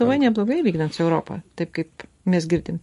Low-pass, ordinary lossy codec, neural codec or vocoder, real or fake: 14.4 kHz; MP3, 48 kbps; none; real